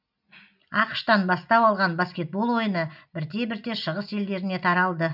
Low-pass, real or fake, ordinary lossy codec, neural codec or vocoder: 5.4 kHz; real; none; none